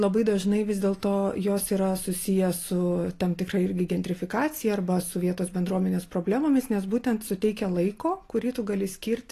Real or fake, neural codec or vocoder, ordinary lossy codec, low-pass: real; none; AAC, 48 kbps; 14.4 kHz